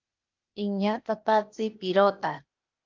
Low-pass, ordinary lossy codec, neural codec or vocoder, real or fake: 7.2 kHz; Opus, 24 kbps; codec, 16 kHz, 0.8 kbps, ZipCodec; fake